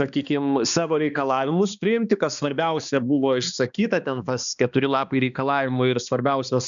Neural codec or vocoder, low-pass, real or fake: codec, 16 kHz, 2 kbps, X-Codec, HuBERT features, trained on balanced general audio; 7.2 kHz; fake